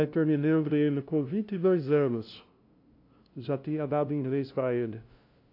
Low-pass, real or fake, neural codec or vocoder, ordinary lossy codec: 5.4 kHz; fake; codec, 16 kHz, 0.5 kbps, FunCodec, trained on LibriTTS, 25 frames a second; AAC, 32 kbps